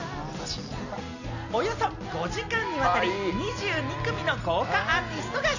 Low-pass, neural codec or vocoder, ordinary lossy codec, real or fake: 7.2 kHz; none; none; real